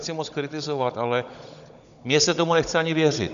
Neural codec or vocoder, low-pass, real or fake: codec, 16 kHz, 16 kbps, FunCodec, trained on Chinese and English, 50 frames a second; 7.2 kHz; fake